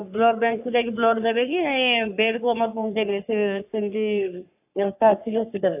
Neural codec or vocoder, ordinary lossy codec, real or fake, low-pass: codec, 44.1 kHz, 3.4 kbps, Pupu-Codec; AAC, 32 kbps; fake; 3.6 kHz